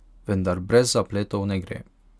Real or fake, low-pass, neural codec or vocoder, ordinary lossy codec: real; none; none; none